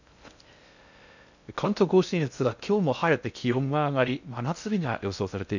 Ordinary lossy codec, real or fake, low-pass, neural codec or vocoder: none; fake; 7.2 kHz; codec, 16 kHz in and 24 kHz out, 0.6 kbps, FocalCodec, streaming, 2048 codes